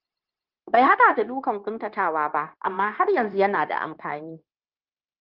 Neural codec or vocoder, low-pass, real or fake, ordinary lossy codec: codec, 16 kHz, 0.9 kbps, LongCat-Audio-Codec; 5.4 kHz; fake; Opus, 32 kbps